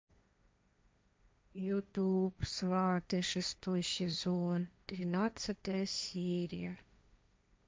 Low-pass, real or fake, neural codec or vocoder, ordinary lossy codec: none; fake; codec, 16 kHz, 1.1 kbps, Voila-Tokenizer; none